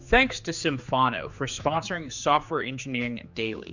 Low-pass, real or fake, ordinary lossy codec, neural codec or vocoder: 7.2 kHz; fake; Opus, 64 kbps; codec, 16 kHz, 4 kbps, X-Codec, HuBERT features, trained on general audio